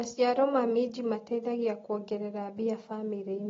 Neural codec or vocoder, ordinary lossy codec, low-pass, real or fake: none; AAC, 24 kbps; 7.2 kHz; real